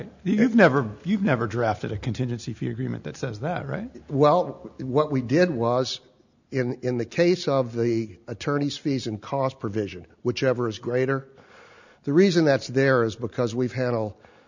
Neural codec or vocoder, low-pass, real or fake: none; 7.2 kHz; real